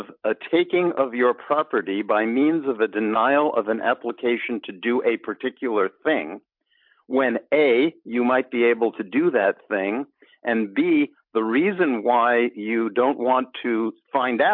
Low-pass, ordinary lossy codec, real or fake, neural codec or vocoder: 5.4 kHz; MP3, 48 kbps; real; none